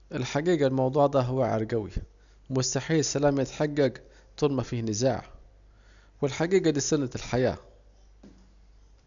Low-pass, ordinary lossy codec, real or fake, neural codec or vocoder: 7.2 kHz; MP3, 96 kbps; real; none